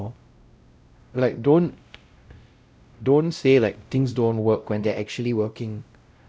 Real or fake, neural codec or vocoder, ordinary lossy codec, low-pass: fake; codec, 16 kHz, 0.5 kbps, X-Codec, WavLM features, trained on Multilingual LibriSpeech; none; none